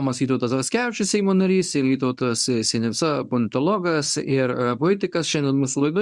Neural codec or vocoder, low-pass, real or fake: codec, 24 kHz, 0.9 kbps, WavTokenizer, medium speech release version 1; 10.8 kHz; fake